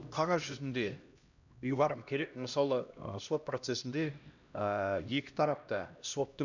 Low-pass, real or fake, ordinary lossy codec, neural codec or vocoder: 7.2 kHz; fake; none; codec, 16 kHz, 1 kbps, X-Codec, HuBERT features, trained on LibriSpeech